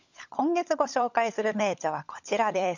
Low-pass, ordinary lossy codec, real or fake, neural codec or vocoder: 7.2 kHz; none; fake; codec, 16 kHz, 16 kbps, FunCodec, trained on LibriTTS, 50 frames a second